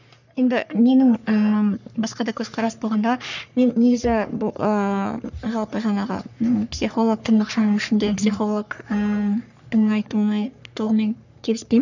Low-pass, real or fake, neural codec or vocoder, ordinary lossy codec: 7.2 kHz; fake; codec, 44.1 kHz, 3.4 kbps, Pupu-Codec; none